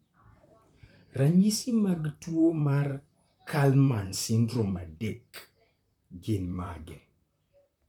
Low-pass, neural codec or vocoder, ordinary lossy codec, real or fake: 19.8 kHz; vocoder, 44.1 kHz, 128 mel bands, Pupu-Vocoder; none; fake